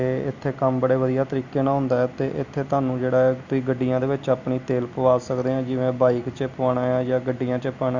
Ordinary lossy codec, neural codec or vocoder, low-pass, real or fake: Opus, 64 kbps; none; 7.2 kHz; real